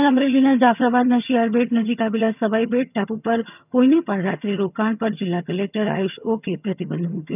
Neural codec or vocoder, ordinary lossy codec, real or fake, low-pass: vocoder, 22.05 kHz, 80 mel bands, HiFi-GAN; none; fake; 3.6 kHz